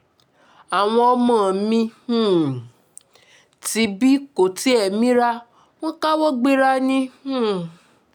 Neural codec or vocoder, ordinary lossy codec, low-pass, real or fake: none; none; 19.8 kHz; real